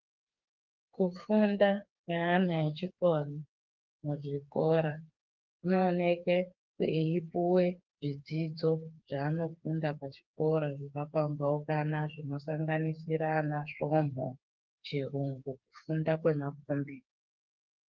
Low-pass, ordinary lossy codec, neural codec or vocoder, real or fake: 7.2 kHz; Opus, 32 kbps; codec, 16 kHz, 4 kbps, FreqCodec, smaller model; fake